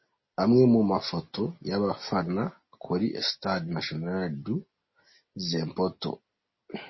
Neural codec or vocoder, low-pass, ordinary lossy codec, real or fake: none; 7.2 kHz; MP3, 24 kbps; real